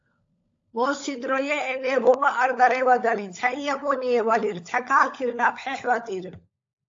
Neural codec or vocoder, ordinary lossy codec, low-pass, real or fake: codec, 16 kHz, 16 kbps, FunCodec, trained on LibriTTS, 50 frames a second; AAC, 48 kbps; 7.2 kHz; fake